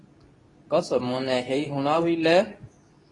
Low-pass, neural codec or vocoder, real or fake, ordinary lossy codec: 10.8 kHz; codec, 24 kHz, 0.9 kbps, WavTokenizer, medium speech release version 2; fake; AAC, 32 kbps